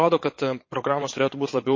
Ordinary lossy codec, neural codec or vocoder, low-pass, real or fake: MP3, 32 kbps; vocoder, 22.05 kHz, 80 mel bands, Vocos; 7.2 kHz; fake